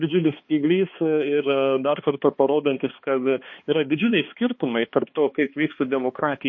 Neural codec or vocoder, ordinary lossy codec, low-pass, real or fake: codec, 16 kHz, 2 kbps, X-Codec, HuBERT features, trained on balanced general audio; MP3, 32 kbps; 7.2 kHz; fake